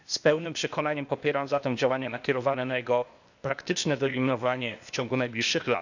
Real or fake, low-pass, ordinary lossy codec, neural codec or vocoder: fake; 7.2 kHz; none; codec, 16 kHz, 0.8 kbps, ZipCodec